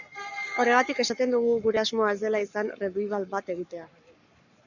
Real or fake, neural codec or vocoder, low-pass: fake; codec, 44.1 kHz, 7.8 kbps, Pupu-Codec; 7.2 kHz